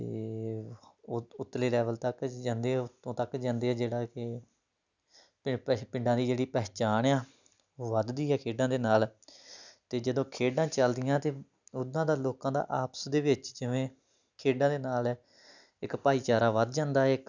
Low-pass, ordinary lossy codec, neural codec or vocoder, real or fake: 7.2 kHz; none; none; real